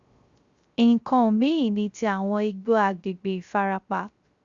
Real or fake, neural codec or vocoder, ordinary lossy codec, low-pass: fake; codec, 16 kHz, 0.3 kbps, FocalCodec; Opus, 64 kbps; 7.2 kHz